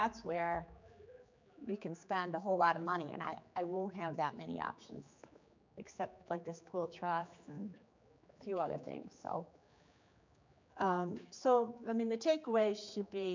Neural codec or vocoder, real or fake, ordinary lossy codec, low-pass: codec, 16 kHz, 4 kbps, X-Codec, HuBERT features, trained on general audio; fake; MP3, 64 kbps; 7.2 kHz